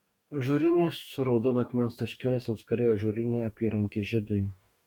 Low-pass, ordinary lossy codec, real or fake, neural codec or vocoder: 19.8 kHz; MP3, 96 kbps; fake; codec, 44.1 kHz, 2.6 kbps, DAC